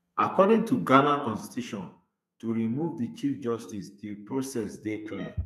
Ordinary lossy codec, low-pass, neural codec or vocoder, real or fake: none; 14.4 kHz; codec, 32 kHz, 1.9 kbps, SNAC; fake